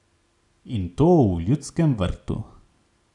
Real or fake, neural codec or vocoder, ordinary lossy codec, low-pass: real; none; none; 10.8 kHz